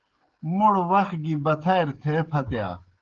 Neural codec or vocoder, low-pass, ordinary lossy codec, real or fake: codec, 16 kHz, 16 kbps, FreqCodec, smaller model; 7.2 kHz; Opus, 16 kbps; fake